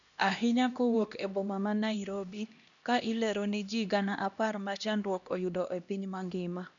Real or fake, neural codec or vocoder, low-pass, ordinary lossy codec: fake; codec, 16 kHz, 1 kbps, X-Codec, HuBERT features, trained on LibriSpeech; 7.2 kHz; none